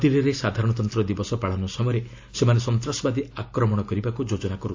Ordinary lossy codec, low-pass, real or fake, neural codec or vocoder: AAC, 48 kbps; 7.2 kHz; real; none